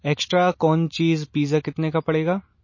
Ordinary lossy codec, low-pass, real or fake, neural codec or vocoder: MP3, 32 kbps; 7.2 kHz; real; none